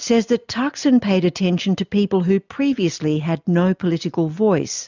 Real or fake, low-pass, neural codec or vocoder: real; 7.2 kHz; none